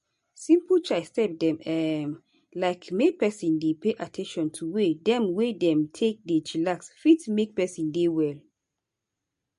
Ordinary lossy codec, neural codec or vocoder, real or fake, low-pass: MP3, 48 kbps; none; real; 14.4 kHz